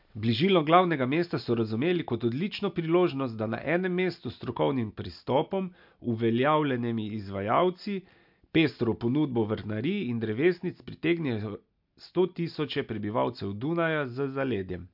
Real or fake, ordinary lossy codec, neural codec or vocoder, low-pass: real; MP3, 48 kbps; none; 5.4 kHz